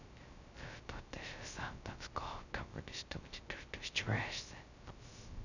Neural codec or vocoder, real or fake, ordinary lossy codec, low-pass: codec, 16 kHz, 0.2 kbps, FocalCodec; fake; none; 7.2 kHz